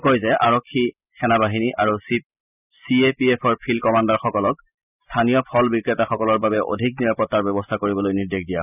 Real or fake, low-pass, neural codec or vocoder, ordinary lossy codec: real; 3.6 kHz; none; none